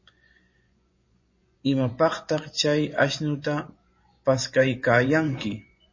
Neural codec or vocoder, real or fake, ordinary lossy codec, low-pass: vocoder, 24 kHz, 100 mel bands, Vocos; fake; MP3, 32 kbps; 7.2 kHz